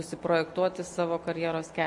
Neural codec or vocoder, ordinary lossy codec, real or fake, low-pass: none; MP3, 48 kbps; real; 10.8 kHz